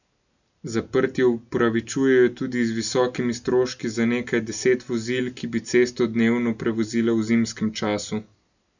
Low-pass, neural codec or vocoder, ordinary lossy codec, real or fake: 7.2 kHz; none; none; real